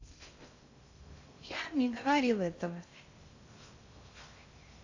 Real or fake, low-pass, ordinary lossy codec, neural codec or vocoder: fake; 7.2 kHz; AAC, 48 kbps; codec, 16 kHz in and 24 kHz out, 0.6 kbps, FocalCodec, streaming, 2048 codes